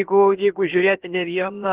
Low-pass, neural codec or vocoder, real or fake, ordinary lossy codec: 3.6 kHz; codec, 16 kHz, about 1 kbps, DyCAST, with the encoder's durations; fake; Opus, 16 kbps